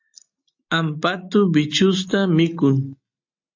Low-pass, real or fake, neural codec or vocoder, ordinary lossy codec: 7.2 kHz; real; none; AAC, 48 kbps